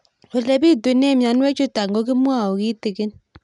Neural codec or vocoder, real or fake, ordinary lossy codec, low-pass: none; real; none; 10.8 kHz